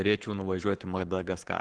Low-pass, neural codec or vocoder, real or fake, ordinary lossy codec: 9.9 kHz; codec, 44.1 kHz, 7.8 kbps, Pupu-Codec; fake; Opus, 16 kbps